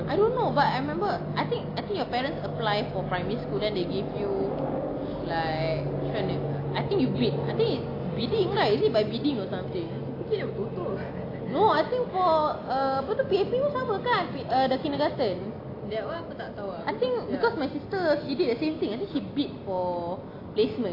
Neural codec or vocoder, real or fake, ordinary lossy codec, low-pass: none; real; AAC, 32 kbps; 5.4 kHz